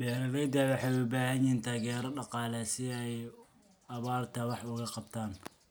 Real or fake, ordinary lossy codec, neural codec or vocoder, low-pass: real; none; none; none